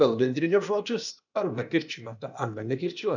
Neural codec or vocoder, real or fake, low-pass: codec, 16 kHz, 0.8 kbps, ZipCodec; fake; 7.2 kHz